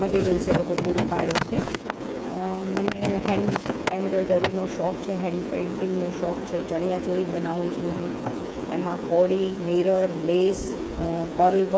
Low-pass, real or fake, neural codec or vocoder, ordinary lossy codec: none; fake; codec, 16 kHz, 4 kbps, FreqCodec, smaller model; none